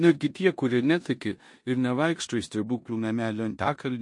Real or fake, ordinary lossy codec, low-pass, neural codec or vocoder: fake; MP3, 48 kbps; 10.8 kHz; codec, 16 kHz in and 24 kHz out, 0.9 kbps, LongCat-Audio-Codec, four codebook decoder